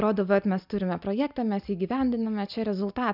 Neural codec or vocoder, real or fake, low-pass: none; real; 5.4 kHz